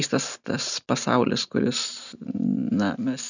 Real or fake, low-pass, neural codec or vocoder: real; 7.2 kHz; none